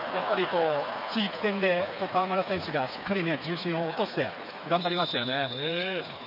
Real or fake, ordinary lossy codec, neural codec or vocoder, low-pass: fake; none; codec, 16 kHz, 4 kbps, FreqCodec, smaller model; 5.4 kHz